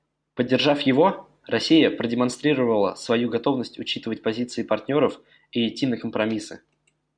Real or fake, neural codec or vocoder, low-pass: real; none; 9.9 kHz